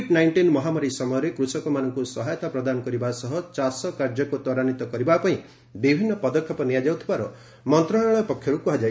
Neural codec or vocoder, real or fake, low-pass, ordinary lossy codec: none; real; none; none